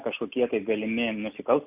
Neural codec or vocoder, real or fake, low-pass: none; real; 3.6 kHz